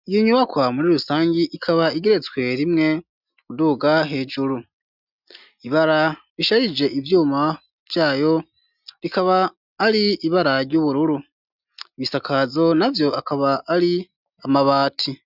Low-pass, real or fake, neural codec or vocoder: 5.4 kHz; real; none